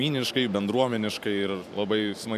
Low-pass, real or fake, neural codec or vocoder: 14.4 kHz; fake; vocoder, 44.1 kHz, 128 mel bands every 512 samples, BigVGAN v2